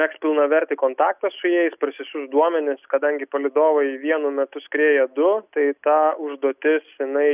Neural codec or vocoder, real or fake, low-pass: none; real; 3.6 kHz